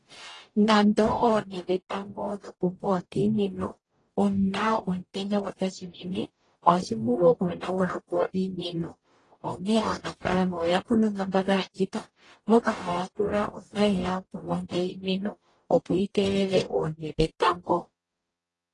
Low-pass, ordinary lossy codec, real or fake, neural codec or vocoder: 10.8 kHz; AAC, 32 kbps; fake; codec, 44.1 kHz, 0.9 kbps, DAC